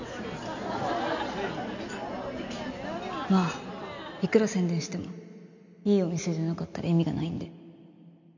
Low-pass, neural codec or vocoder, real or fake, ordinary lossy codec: 7.2 kHz; none; real; none